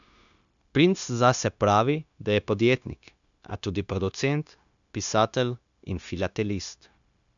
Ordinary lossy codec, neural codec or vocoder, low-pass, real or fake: none; codec, 16 kHz, 0.9 kbps, LongCat-Audio-Codec; 7.2 kHz; fake